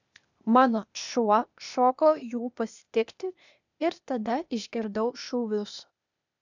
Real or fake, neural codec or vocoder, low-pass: fake; codec, 16 kHz, 0.8 kbps, ZipCodec; 7.2 kHz